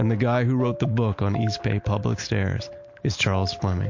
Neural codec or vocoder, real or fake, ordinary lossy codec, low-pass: none; real; MP3, 48 kbps; 7.2 kHz